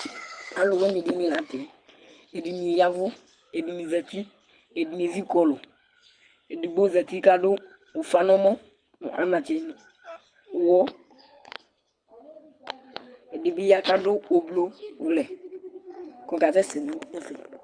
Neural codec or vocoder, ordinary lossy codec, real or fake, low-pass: codec, 24 kHz, 6 kbps, HILCodec; Opus, 64 kbps; fake; 9.9 kHz